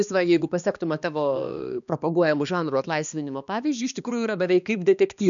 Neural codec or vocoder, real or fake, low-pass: codec, 16 kHz, 2 kbps, X-Codec, HuBERT features, trained on balanced general audio; fake; 7.2 kHz